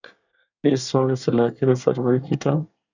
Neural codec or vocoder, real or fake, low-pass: codec, 24 kHz, 1 kbps, SNAC; fake; 7.2 kHz